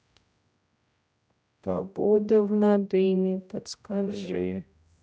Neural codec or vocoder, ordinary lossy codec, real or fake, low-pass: codec, 16 kHz, 0.5 kbps, X-Codec, HuBERT features, trained on general audio; none; fake; none